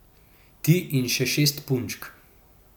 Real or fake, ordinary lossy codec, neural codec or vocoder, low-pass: real; none; none; none